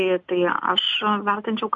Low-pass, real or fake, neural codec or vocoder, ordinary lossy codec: 7.2 kHz; real; none; MP3, 48 kbps